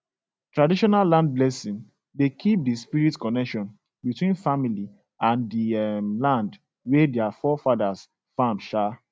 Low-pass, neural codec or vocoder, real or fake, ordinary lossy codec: none; none; real; none